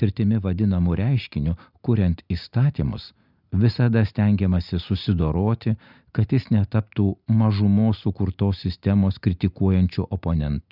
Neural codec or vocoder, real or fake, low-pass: none; real; 5.4 kHz